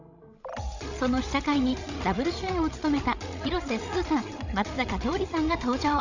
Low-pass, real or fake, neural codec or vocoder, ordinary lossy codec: 7.2 kHz; fake; codec, 16 kHz, 16 kbps, FreqCodec, larger model; none